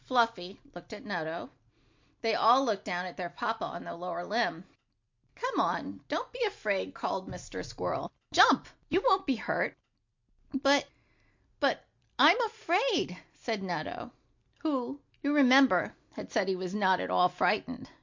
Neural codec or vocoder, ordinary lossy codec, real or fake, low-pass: none; MP3, 48 kbps; real; 7.2 kHz